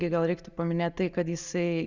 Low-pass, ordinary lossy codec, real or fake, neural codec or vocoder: 7.2 kHz; Opus, 64 kbps; real; none